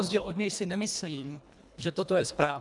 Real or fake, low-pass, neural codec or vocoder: fake; 10.8 kHz; codec, 24 kHz, 1.5 kbps, HILCodec